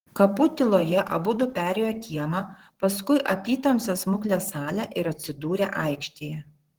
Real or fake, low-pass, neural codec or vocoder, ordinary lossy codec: fake; 19.8 kHz; vocoder, 44.1 kHz, 128 mel bands, Pupu-Vocoder; Opus, 16 kbps